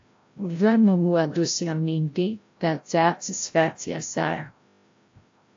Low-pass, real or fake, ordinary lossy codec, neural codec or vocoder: 7.2 kHz; fake; AAC, 48 kbps; codec, 16 kHz, 0.5 kbps, FreqCodec, larger model